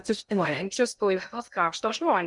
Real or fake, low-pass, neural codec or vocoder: fake; 10.8 kHz; codec, 16 kHz in and 24 kHz out, 0.6 kbps, FocalCodec, streaming, 2048 codes